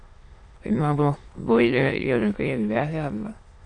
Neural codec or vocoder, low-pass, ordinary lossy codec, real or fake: autoencoder, 22.05 kHz, a latent of 192 numbers a frame, VITS, trained on many speakers; 9.9 kHz; AAC, 48 kbps; fake